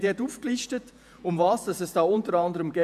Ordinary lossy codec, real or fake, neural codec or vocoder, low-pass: none; fake; vocoder, 48 kHz, 128 mel bands, Vocos; 14.4 kHz